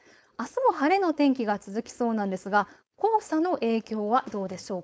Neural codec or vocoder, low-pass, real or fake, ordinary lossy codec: codec, 16 kHz, 4.8 kbps, FACodec; none; fake; none